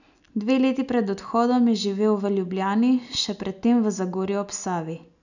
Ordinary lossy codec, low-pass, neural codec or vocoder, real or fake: none; 7.2 kHz; none; real